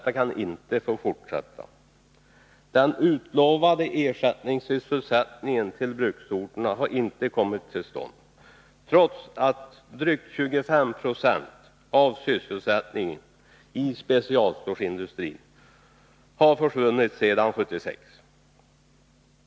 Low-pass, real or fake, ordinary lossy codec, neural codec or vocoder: none; real; none; none